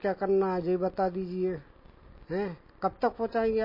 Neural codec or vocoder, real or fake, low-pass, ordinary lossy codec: none; real; 5.4 kHz; MP3, 24 kbps